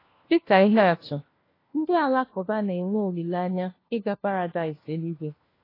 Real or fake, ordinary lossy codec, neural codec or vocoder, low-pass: fake; AAC, 24 kbps; codec, 16 kHz, 1 kbps, FunCodec, trained on LibriTTS, 50 frames a second; 5.4 kHz